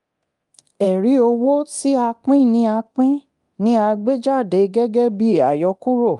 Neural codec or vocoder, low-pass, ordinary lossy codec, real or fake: codec, 24 kHz, 0.9 kbps, DualCodec; 10.8 kHz; Opus, 32 kbps; fake